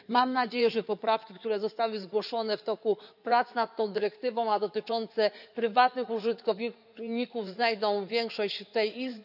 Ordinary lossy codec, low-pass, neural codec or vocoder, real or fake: none; 5.4 kHz; codec, 16 kHz in and 24 kHz out, 2.2 kbps, FireRedTTS-2 codec; fake